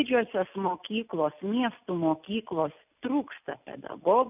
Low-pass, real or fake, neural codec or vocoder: 3.6 kHz; real; none